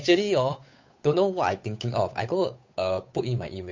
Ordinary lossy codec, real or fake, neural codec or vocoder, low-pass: MP3, 64 kbps; fake; codec, 16 kHz, 8 kbps, FunCodec, trained on Chinese and English, 25 frames a second; 7.2 kHz